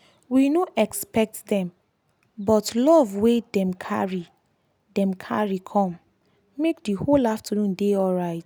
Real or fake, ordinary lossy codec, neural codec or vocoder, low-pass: real; none; none; none